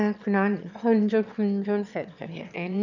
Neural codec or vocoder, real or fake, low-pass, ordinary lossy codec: autoencoder, 22.05 kHz, a latent of 192 numbers a frame, VITS, trained on one speaker; fake; 7.2 kHz; none